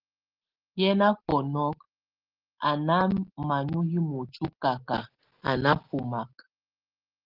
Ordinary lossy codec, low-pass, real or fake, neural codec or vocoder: Opus, 16 kbps; 5.4 kHz; real; none